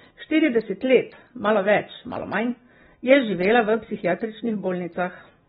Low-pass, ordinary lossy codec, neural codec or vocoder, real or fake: 10.8 kHz; AAC, 16 kbps; none; real